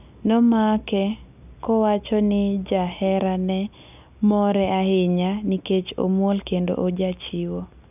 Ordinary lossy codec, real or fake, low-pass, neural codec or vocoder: none; real; 3.6 kHz; none